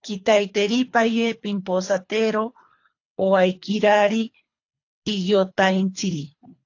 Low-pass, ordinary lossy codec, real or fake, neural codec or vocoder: 7.2 kHz; AAC, 48 kbps; fake; codec, 24 kHz, 3 kbps, HILCodec